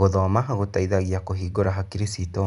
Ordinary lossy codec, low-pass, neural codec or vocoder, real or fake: none; 10.8 kHz; none; real